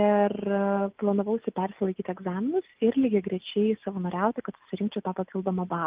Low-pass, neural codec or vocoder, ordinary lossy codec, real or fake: 3.6 kHz; none; Opus, 24 kbps; real